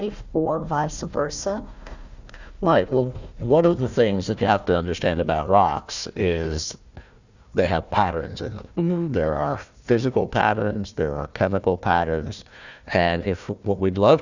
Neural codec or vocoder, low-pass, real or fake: codec, 16 kHz, 1 kbps, FunCodec, trained on Chinese and English, 50 frames a second; 7.2 kHz; fake